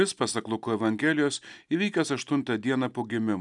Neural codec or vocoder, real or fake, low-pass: none; real; 10.8 kHz